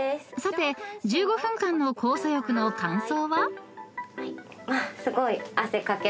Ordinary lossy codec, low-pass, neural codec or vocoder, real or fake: none; none; none; real